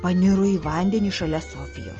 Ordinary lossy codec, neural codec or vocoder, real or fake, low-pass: Opus, 24 kbps; none; real; 7.2 kHz